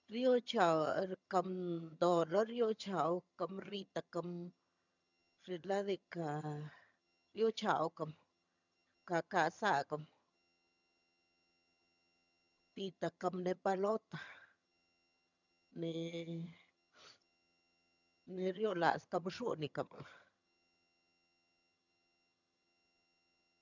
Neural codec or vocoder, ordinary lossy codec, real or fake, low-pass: vocoder, 22.05 kHz, 80 mel bands, HiFi-GAN; none; fake; 7.2 kHz